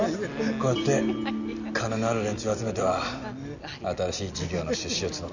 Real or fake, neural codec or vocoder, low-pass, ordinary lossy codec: real; none; 7.2 kHz; none